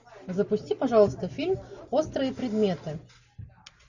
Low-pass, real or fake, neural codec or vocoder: 7.2 kHz; real; none